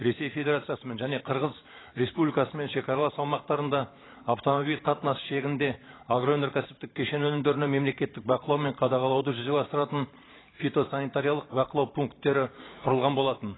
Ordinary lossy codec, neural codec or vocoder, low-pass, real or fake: AAC, 16 kbps; vocoder, 44.1 kHz, 128 mel bands every 512 samples, BigVGAN v2; 7.2 kHz; fake